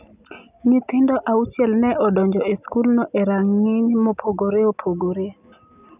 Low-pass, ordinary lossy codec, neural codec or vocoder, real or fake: 3.6 kHz; none; none; real